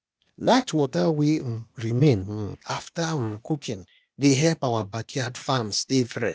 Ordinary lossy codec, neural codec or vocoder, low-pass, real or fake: none; codec, 16 kHz, 0.8 kbps, ZipCodec; none; fake